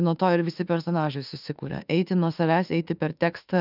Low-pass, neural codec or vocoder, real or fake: 5.4 kHz; autoencoder, 48 kHz, 32 numbers a frame, DAC-VAE, trained on Japanese speech; fake